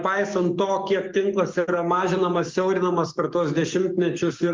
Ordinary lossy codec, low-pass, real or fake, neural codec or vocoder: Opus, 32 kbps; 7.2 kHz; fake; codec, 44.1 kHz, 7.8 kbps, DAC